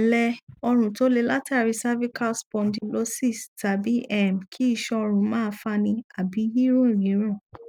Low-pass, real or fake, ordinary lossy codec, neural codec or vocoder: 19.8 kHz; real; none; none